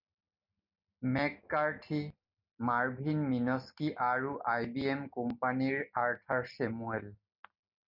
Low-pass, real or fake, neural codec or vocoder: 5.4 kHz; real; none